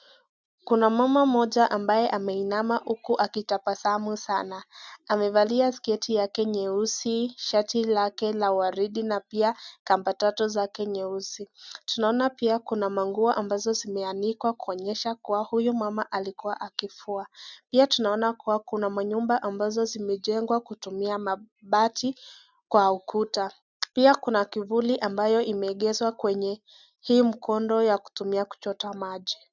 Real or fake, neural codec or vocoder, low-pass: real; none; 7.2 kHz